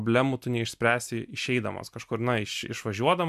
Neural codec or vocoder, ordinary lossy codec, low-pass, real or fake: none; MP3, 96 kbps; 14.4 kHz; real